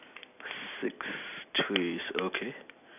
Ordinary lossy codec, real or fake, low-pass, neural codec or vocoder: none; real; 3.6 kHz; none